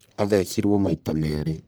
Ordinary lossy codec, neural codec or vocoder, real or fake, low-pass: none; codec, 44.1 kHz, 1.7 kbps, Pupu-Codec; fake; none